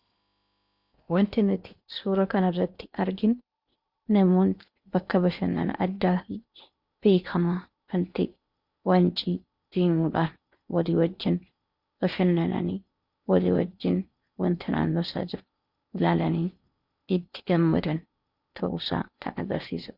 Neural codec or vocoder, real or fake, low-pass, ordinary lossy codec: codec, 16 kHz in and 24 kHz out, 0.8 kbps, FocalCodec, streaming, 65536 codes; fake; 5.4 kHz; Opus, 64 kbps